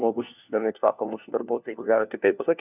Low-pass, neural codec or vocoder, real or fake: 3.6 kHz; codec, 16 kHz, 1 kbps, FunCodec, trained on LibriTTS, 50 frames a second; fake